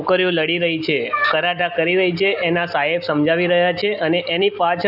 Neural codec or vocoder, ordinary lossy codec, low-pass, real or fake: none; none; 5.4 kHz; real